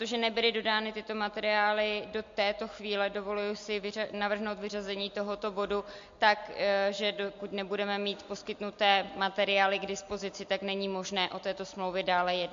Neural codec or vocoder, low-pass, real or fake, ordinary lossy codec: none; 7.2 kHz; real; MP3, 48 kbps